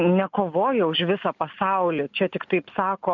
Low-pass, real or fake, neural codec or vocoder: 7.2 kHz; real; none